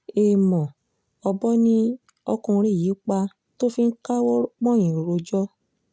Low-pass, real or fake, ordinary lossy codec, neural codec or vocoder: none; real; none; none